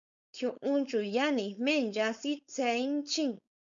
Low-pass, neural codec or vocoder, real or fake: 7.2 kHz; codec, 16 kHz, 4.8 kbps, FACodec; fake